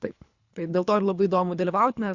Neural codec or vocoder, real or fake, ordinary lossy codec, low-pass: codec, 24 kHz, 3 kbps, HILCodec; fake; Opus, 64 kbps; 7.2 kHz